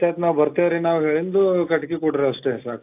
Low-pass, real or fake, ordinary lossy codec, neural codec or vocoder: 3.6 kHz; real; AAC, 32 kbps; none